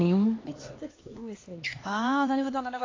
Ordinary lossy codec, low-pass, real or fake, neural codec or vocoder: AAC, 32 kbps; 7.2 kHz; fake; codec, 16 kHz, 2 kbps, X-Codec, HuBERT features, trained on LibriSpeech